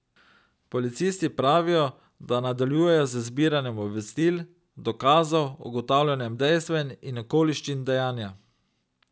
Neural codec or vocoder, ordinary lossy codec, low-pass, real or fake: none; none; none; real